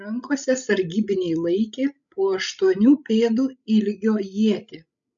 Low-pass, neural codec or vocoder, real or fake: 7.2 kHz; codec, 16 kHz, 16 kbps, FreqCodec, larger model; fake